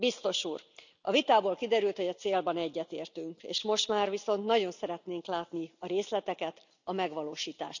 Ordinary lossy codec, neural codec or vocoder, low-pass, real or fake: none; none; 7.2 kHz; real